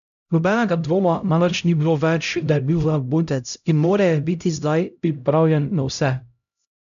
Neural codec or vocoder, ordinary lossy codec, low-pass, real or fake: codec, 16 kHz, 0.5 kbps, X-Codec, HuBERT features, trained on LibriSpeech; none; 7.2 kHz; fake